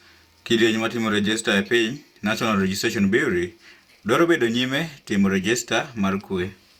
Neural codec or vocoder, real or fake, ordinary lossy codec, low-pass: vocoder, 44.1 kHz, 128 mel bands every 512 samples, BigVGAN v2; fake; Opus, 64 kbps; 19.8 kHz